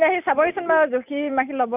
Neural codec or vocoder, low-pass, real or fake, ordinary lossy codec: none; 3.6 kHz; real; none